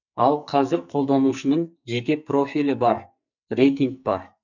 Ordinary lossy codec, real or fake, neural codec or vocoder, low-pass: none; fake; codec, 44.1 kHz, 2.6 kbps, SNAC; 7.2 kHz